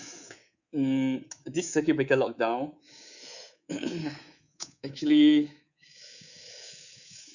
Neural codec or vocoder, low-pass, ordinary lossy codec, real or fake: codec, 24 kHz, 3.1 kbps, DualCodec; 7.2 kHz; none; fake